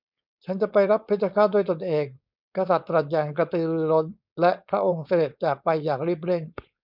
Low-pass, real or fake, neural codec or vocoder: 5.4 kHz; fake; codec, 16 kHz, 4.8 kbps, FACodec